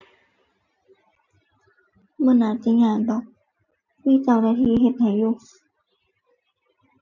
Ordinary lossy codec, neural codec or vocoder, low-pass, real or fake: none; none; 7.2 kHz; real